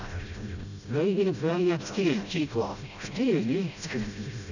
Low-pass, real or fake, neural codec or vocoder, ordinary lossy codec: 7.2 kHz; fake; codec, 16 kHz, 0.5 kbps, FreqCodec, smaller model; none